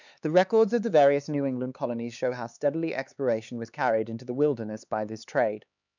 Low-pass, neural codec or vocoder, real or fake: 7.2 kHz; codec, 16 kHz, 4 kbps, X-Codec, HuBERT features, trained on LibriSpeech; fake